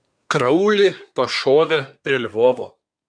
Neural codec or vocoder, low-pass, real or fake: codec, 24 kHz, 1 kbps, SNAC; 9.9 kHz; fake